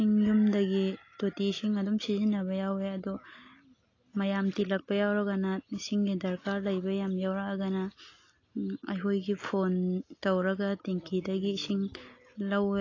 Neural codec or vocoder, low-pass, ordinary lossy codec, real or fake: none; 7.2 kHz; AAC, 32 kbps; real